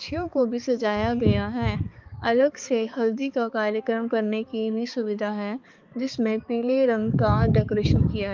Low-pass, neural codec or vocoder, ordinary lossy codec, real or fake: 7.2 kHz; codec, 16 kHz, 4 kbps, X-Codec, HuBERT features, trained on balanced general audio; Opus, 24 kbps; fake